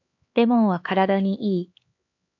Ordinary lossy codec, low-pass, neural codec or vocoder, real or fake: AAC, 48 kbps; 7.2 kHz; codec, 16 kHz, 4 kbps, X-Codec, HuBERT features, trained on LibriSpeech; fake